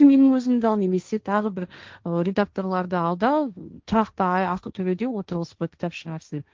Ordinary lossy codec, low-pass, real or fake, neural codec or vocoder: Opus, 32 kbps; 7.2 kHz; fake; codec, 16 kHz, 1.1 kbps, Voila-Tokenizer